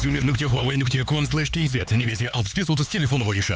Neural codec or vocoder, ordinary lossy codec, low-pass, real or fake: codec, 16 kHz, 4 kbps, X-Codec, HuBERT features, trained on LibriSpeech; none; none; fake